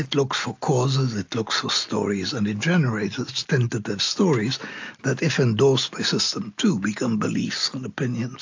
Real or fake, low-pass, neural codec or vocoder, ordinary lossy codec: real; 7.2 kHz; none; AAC, 48 kbps